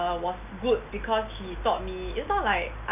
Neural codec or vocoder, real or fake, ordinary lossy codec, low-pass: none; real; none; 3.6 kHz